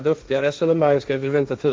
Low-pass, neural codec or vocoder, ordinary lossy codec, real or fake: none; codec, 16 kHz, 1.1 kbps, Voila-Tokenizer; none; fake